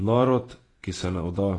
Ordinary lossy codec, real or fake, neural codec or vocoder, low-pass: AAC, 32 kbps; fake; vocoder, 48 kHz, 128 mel bands, Vocos; 10.8 kHz